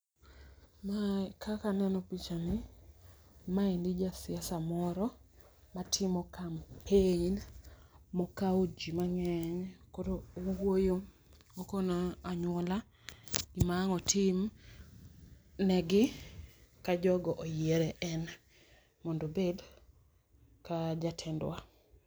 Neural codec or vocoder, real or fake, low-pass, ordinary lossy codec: none; real; none; none